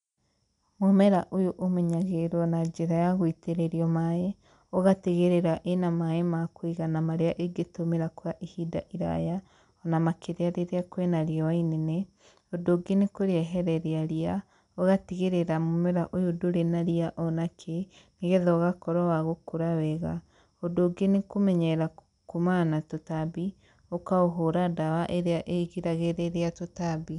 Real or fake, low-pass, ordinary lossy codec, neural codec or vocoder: real; 10.8 kHz; none; none